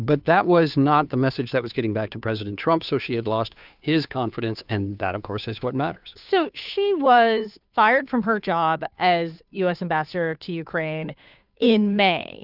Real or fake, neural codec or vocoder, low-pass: fake; codec, 16 kHz, 2 kbps, FunCodec, trained on Chinese and English, 25 frames a second; 5.4 kHz